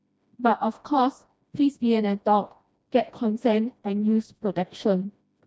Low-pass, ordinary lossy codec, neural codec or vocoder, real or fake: none; none; codec, 16 kHz, 1 kbps, FreqCodec, smaller model; fake